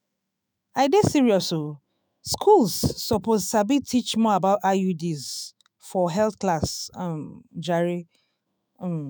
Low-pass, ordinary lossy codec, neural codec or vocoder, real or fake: none; none; autoencoder, 48 kHz, 128 numbers a frame, DAC-VAE, trained on Japanese speech; fake